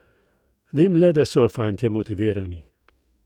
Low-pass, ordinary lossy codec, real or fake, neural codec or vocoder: 19.8 kHz; none; fake; codec, 44.1 kHz, 2.6 kbps, DAC